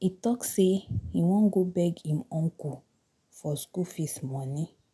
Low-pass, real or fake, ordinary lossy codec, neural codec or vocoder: none; real; none; none